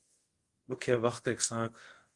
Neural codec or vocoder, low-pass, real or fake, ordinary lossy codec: codec, 24 kHz, 0.5 kbps, DualCodec; 10.8 kHz; fake; Opus, 24 kbps